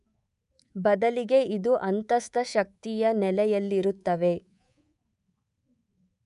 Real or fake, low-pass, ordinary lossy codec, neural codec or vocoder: fake; 10.8 kHz; none; codec, 24 kHz, 3.1 kbps, DualCodec